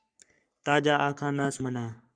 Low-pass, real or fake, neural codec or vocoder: 9.9 kHz; fake; codec, 44.1 kHz, 3.4 kbps, Pupu-Codec